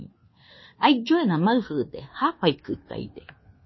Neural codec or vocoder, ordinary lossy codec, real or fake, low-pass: codec, 24 kHz, 1.2 kbps, DualCodec; MP3, 24 kbps; fake; 7.2 kHz